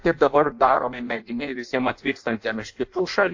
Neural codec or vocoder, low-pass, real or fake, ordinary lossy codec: codec, 16 kHz in and 24 kHz out, 0.6 kbps, FireRedTTS-2 codec; 7.2 kHz; fake; AAC, 48 kbps